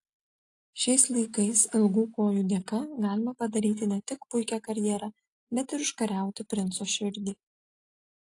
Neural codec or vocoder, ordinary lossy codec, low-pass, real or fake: none; AAC, 48 kbps; 10.8 kHz; real